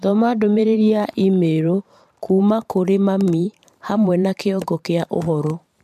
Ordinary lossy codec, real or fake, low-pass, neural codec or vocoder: MP3, 96 kbps; fake; 14.4 kHz; vocoder, 44.1 kHz, 128 mel bands every 512 samples, BigVGAN v2